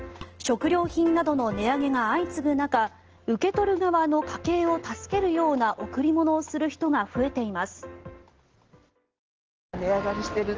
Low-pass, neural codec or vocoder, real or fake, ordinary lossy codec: 7.2 kHz; none; real; Opus, 16 kbps